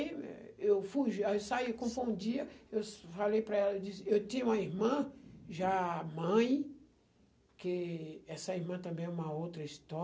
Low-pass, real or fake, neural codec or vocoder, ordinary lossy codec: none; real; none; none